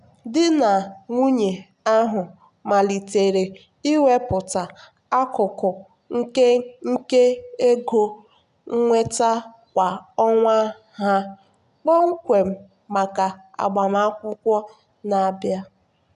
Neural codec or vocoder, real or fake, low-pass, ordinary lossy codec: none; real; 10.8 kHz; none